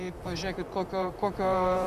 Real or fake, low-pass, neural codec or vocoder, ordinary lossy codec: fake; 14.4 kHz; vocoder, 44.1 kHz, 128 mel bands, Pupu-Vocoder; AAC, 96 kbps